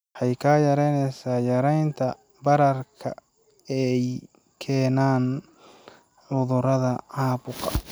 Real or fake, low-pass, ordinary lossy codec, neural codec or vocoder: real; none; none; none